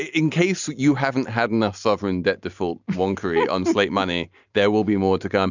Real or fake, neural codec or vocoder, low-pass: real; none; 7.2 kHz